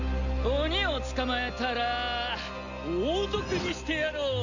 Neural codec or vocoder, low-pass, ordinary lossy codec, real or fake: none; 7.2 kHz; none; real